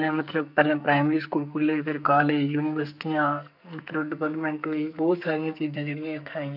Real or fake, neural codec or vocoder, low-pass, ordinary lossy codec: fake; codec, 44.1 kHz, 2.6 kbps, SNAC; 5.4 kHz; none